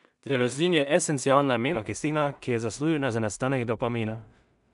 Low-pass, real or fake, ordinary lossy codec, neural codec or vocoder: 10.8 kHz; fake; none; codec, 16 kHz in and 24 kHz out, 0.4 kbps, LongCat-Audio-Codec, two codebook decoder